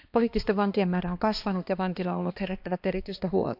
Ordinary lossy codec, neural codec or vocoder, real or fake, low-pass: AAC, 48 kbps; codec, 16 kHz, 2 kbps, X-Codec, HuBERT features, trained on balanced general audio; fake; 5.4 kHz